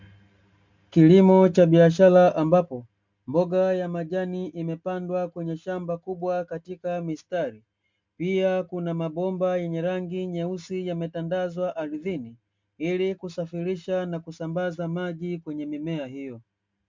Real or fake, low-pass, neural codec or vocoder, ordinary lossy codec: real; 7.2 kHz; none; MP3, 64 kbps